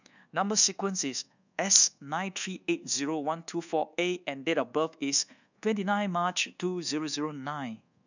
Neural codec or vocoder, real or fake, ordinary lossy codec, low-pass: codec, 24 kHz, 1.2 kbps, DualCodec; fake; none; 7.2 kHz